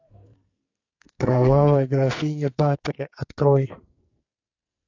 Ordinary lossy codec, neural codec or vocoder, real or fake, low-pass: AAC, 48 kbps; codec, 44.1 kHz, 2.6 kbps, SNAC; fake; 7.2 kHz